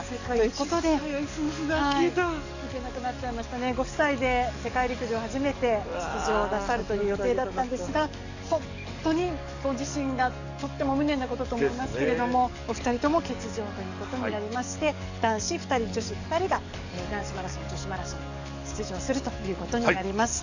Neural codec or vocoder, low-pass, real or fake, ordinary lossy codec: codec, 44.1 kHz, 7.8 kbps, DAC; 7.2 kHz; fake; none